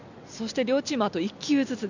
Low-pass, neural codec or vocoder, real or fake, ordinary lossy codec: 7.2 kHz; none; real; none